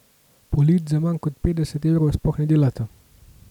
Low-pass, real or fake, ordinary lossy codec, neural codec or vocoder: 19.8 kHz; real; none; none